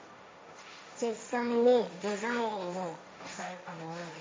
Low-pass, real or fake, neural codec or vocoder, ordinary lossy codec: none; fake; codec, 16 kHz, 1.1 kbps, Voila-Tokenizer; none